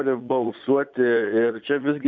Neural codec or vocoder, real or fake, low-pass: vocoder, 22.05 kHz, 80 mel bands, Vocos; fake; 7.2 kHz